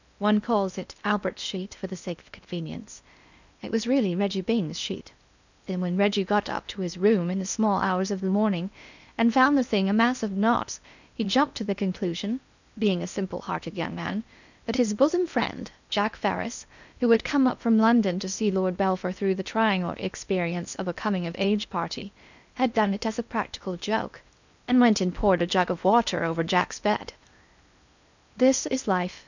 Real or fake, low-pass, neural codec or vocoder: fake; 7.2 kHz; codec, 16 kHz in and 24 kHz out, 0.8 kbps, FocalCodec, streaming, 65536 codes